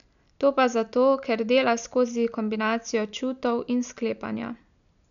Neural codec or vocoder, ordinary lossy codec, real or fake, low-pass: none; none; real; 7.2 kHz